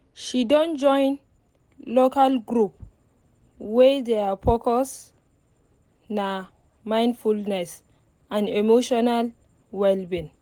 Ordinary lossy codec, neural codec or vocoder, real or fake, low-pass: Opus, 24 kbps; none; real; 19.8 kHz